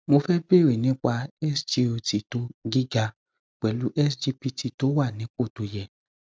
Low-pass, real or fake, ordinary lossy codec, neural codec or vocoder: none; real; none; none